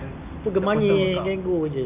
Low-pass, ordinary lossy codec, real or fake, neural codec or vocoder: 3.6 kHz; Opus, 64 kbps; real; none